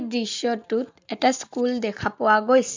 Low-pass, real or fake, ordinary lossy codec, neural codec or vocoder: 7.2 kHz; real; MP3, 64 kbps; none